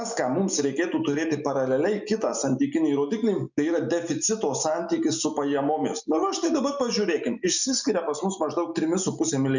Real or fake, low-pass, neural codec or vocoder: real; 7.2 kHz; none